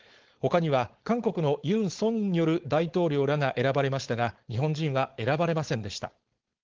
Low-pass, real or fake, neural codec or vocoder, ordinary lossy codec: 7.2 kHz; fake; codec, 16 kHz, 4.8 kbps, FACodec; Opus, 16 kbps